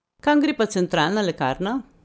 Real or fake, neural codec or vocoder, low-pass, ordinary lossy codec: real; none; none; none